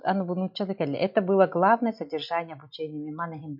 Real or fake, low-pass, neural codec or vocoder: real; 5.4 kHz; none